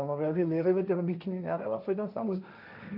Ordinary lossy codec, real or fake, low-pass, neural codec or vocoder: none; fake; 5.4 kHz; codec, 16 kHz, 1.1 kbps, Voila-Tokenizer